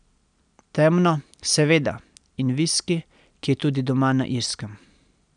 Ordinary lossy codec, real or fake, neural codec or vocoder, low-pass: none; real; none; 9.9 kHz